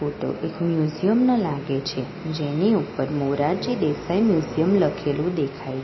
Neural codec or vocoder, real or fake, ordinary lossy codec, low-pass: none; real; MP3, 24 kbps; 7.2 kHz